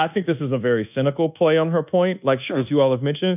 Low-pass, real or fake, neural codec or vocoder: 3.6 kHz; fake; codec, 24 kHz, 1.2 kbps, DualCodec